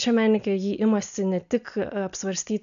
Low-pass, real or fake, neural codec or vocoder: 7.2 kHz; real; none